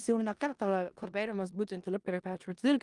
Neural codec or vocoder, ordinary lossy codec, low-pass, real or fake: codec, 16 kHz in and 24 kHz out, 0.4 kbps, LongCat-Audio-Codec, four codebook decoder; Opus, 32 kbps; 10.8 kHz; fake